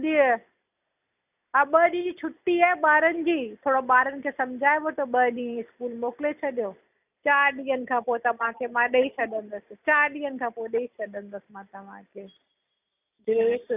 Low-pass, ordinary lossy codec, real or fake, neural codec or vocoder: 3.6 kHz; none; real; none